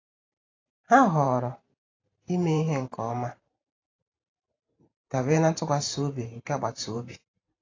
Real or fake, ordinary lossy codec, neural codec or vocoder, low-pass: fake; AAC, 32 kbps; vocoder, 44.1 kHz, 128 mel bands every 512 samples, BigVGAN v2; 7.2 kHz